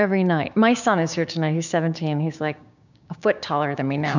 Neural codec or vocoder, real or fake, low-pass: codec, 16 kHz, 6 kbps, DAC; fake; 7.2 kHz